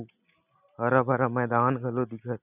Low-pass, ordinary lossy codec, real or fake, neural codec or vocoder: 3.6 kHz; none; real; none